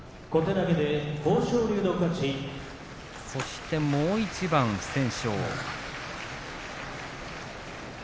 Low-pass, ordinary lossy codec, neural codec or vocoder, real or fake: none; none; none; real